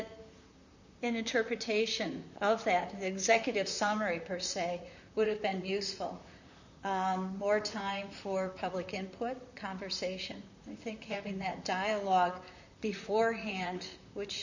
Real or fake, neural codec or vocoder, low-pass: fake; vocoder, 44.1 kHz, 128 mel bands, Pupu-Vocoder; 7.2 kHz